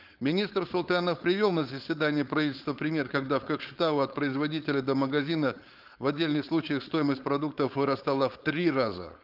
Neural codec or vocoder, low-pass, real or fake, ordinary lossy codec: codec, 16 kHz, 4.8 kbps, FACodec; 5.4 kHz; fake; Opus, 32 kbps